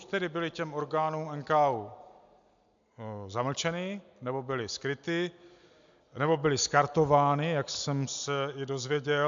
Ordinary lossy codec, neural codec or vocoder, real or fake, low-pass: MP3, 64 kbps; none; real; 7.2 kHz